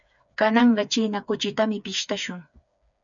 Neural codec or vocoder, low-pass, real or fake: codec, 16 kHz, 4 kbps, FreqCodec, smaller model; 7.2 kHz; fake